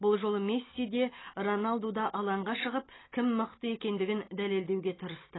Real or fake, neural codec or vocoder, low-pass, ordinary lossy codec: real; none; 7.2 kHz; AAC, 16 kbps